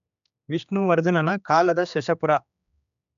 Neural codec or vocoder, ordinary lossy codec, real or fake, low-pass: codec, 16 kHz, 2 kbps, X-Codec, HuBERT features, trained on general audio; none; fake; 7.2 kHz